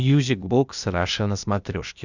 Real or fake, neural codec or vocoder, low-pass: fake; codec, 16 kHz, 0.8 kbps, ZipCodec; 7.2 kHz